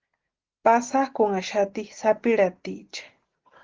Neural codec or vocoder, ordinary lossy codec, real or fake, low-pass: none; Opus, 16 kbps; real; 7.2 kHz